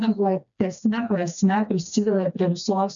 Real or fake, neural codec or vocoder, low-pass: fake; codec, 16 kHz, 2 kbps, FreqCodec, smaller model; 7.2 kHz